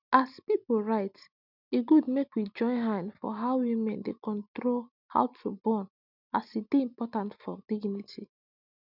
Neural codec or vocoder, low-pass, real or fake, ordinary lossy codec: none; 5.4 kHz; real; none